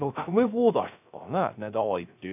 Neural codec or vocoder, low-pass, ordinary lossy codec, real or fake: codec, 16 kHz, 0.3 kbps, FocalCodec; 3.6 kHz; none; fake